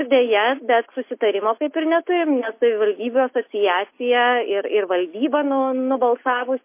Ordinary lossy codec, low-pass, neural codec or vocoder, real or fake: MP3, 24 kbps; 3.6 kHz; none; real